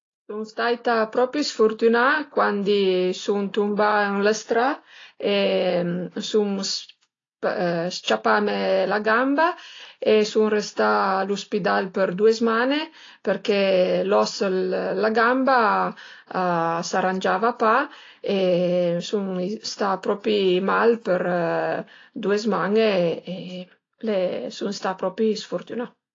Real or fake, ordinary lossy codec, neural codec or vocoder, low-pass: real; AAC, 32 kbps; none; 7.2 kHz